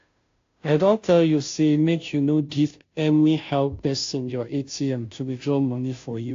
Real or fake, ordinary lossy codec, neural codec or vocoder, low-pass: fake; AAC, 48 kbps; codec, 16 kHz, 0.5 kbps, FunCodec, trained on Chinese and English, 25 frames a second; 7.2 kHz